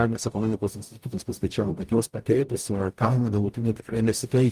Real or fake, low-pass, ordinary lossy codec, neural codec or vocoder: fake; 14.4 kHz; Opus, 32 kbps; codec, 44.1 kHz, 0.9 kbps, DAC